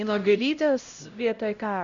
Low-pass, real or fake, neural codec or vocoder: 7.2 kHz; fake; codec, 16 kHz, 0.5 kbps, X-Codec, HuBERT features, trained on LibriSpeech